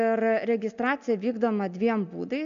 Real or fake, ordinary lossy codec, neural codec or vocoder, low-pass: real; MP3, 64 kbps; none; 7.2 kHz